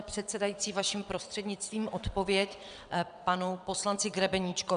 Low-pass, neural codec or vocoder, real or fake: 9.9 kHz; vocoder, 22.05 kHz, 80 mel bands, WaveNeXt; fake